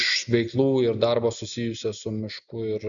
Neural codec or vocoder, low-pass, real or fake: none; 7.2 kHz; real